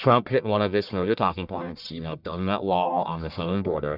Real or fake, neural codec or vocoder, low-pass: fake; codec, 44.1 kHz, 1.7 kbps, Pupu-Codec; 5.4 kHz